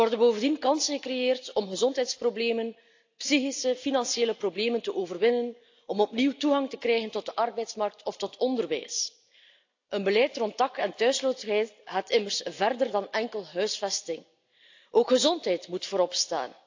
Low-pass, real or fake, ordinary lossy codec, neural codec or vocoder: 7.2 kHz; real; AAC, 48 kbps; none